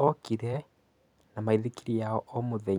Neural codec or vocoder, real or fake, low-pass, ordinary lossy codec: vocoder, 44.1 kHz, 128 mel bands every 256 samples, BigVGAN v2; fake; 19.8 kHz; none